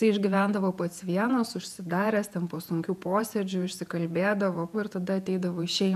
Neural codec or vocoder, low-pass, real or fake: vocoder, 48 kHz, 128 mel bands, Vocos; 14.4 kHz; fake